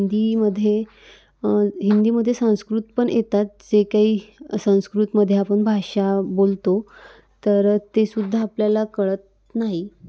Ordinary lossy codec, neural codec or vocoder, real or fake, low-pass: none; none; real; none